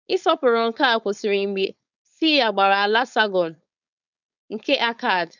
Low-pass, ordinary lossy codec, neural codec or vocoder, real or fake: 7.2 kHz; none; codec, 16 kHz, 4.8 kbps, FACodec; fake